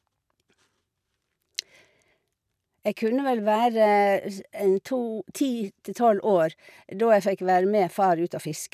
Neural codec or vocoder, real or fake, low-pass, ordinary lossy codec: none; real; 14.4 kHz; none